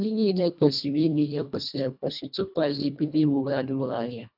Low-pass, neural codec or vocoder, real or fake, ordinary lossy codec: 5.4 kHz; codec, 24 kHz, 1.5 kbps, HILCodec; fake; none